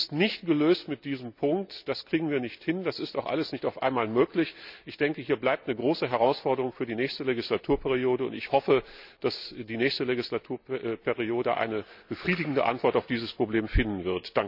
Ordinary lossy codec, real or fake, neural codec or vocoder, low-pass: none; real; none; 5.4 kHz